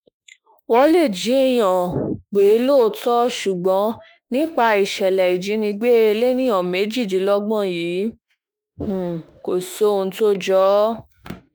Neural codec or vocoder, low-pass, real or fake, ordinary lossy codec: autoencoder, 48 kHz, 32 numbers a frame, DAC-VAE, trained on Japanese speech; none; fake; none